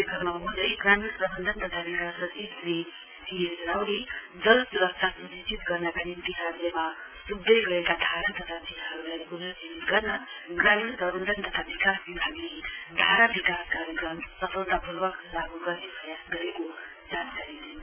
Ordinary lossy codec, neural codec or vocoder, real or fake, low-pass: none; vocoder, 44.1 kHz, 80 mel bands, Vocos; fake; 3.6 kHz